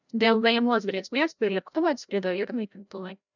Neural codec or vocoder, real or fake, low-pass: codec, 16 kHz, 0.5 kbps, FreqCodec, larger model; fake; 7.2 kHz